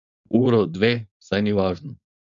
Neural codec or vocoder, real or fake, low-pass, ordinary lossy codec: codec, 16 kHz, 4.8 kbps, FACodec; fake; 7.2 kHz; none